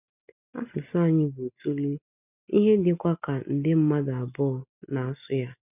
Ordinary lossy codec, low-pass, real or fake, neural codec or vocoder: none; 3.6 kHz; real; none